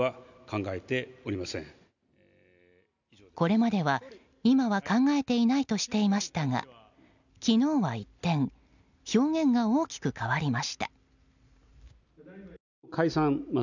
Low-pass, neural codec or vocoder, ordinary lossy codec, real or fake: 7.2 kHz; none; none; real